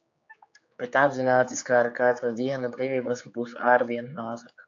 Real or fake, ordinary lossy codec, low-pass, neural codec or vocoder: fake; AAC, 48 kbps; 7.2 kHz; codec, 16 kHz, 4 kbps, X-Codec, HuBERT features, trained on general audio